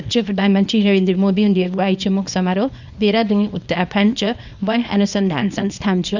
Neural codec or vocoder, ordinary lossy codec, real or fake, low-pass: codec, 24 kHz, 0.9 kbps, WavTokenizer, small release; none; fake; 7.2 kHz